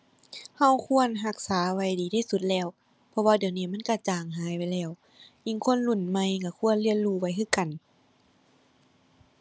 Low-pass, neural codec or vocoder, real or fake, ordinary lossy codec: none; none; real; none